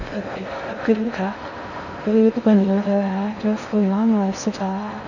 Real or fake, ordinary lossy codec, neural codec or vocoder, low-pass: fake; none; codec, 16 kHz in and 24 kHz out, 0.6 kbps, FocalCodec, streaming, 4096 codes; 7.2 kHz